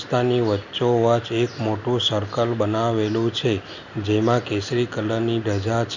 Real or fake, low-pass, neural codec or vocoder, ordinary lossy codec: real; 7.2 kHz; none; none